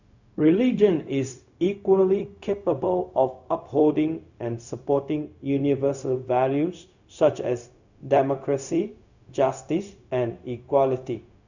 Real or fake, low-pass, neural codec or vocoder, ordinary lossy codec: fake; 7.2 kHz; codec, 16 kHz, 0.4 kbps, LongCat-Audio-Codec; none